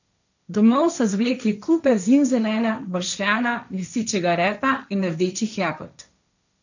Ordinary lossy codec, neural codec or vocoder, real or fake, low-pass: none; codec, 16 kHz, 1.1 kbps, Voila-Tokenizer; fake; 7.2 kHz